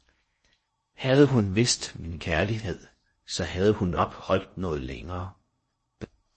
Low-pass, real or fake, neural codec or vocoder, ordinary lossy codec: 10.8 kHz; fake; codec, 16 kHz in and 24 kHz out, 0.6 kbps, FocalCodec, streaming, 4096 codes; MP3, 32 kbps